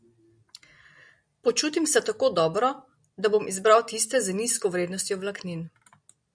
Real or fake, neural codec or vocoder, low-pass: real; none; 9.9 kHz